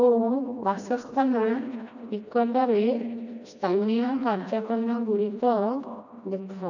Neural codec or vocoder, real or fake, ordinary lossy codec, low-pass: codec, 16 kHz, 1 kbps, FreqCodec, smaller model; fake; none; 7.2 kHz